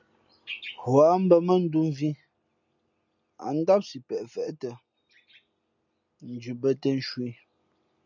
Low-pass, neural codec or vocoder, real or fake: 7.2 kHz; none; real